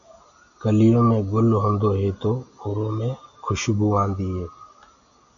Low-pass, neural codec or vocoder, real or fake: 7.2 kHz; none; real